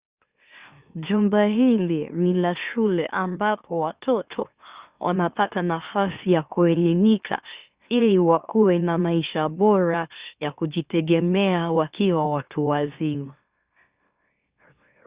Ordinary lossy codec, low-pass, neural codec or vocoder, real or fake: Opus, 64 kbps; 3.6 kHz; autoencoder, 44.1 kHz, a latent of 192 numbers a frame, MeloTTS; fake